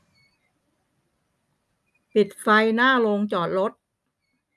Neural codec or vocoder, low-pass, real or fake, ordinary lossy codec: none; none; real; none